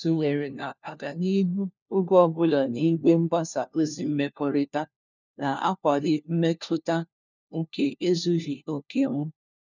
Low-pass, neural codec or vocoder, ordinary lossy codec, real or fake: 7.2 kHz; codec, 16 kHz, 1 kbps, FunCodec, trained on LibriTTS, 50 frames a second; none; fake